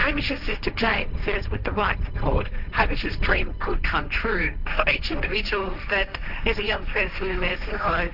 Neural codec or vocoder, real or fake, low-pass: codec, 16 kHz, 1.1 kbps, Voila-Tokenizer; fake; 5.4 kHz